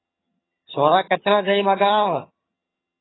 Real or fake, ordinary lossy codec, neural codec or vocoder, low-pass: fake; AAC, 16 kbps; vocoder, 22.05 kHz, 80 mel bands, HiFi-GAN; 7.2 kHz